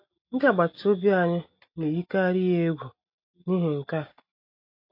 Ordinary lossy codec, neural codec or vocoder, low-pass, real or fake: MP3, 32 kbps; none; 5.4 kHz; real